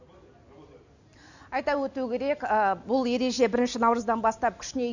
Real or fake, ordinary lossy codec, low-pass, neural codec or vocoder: real; none; 7.2 kHz; none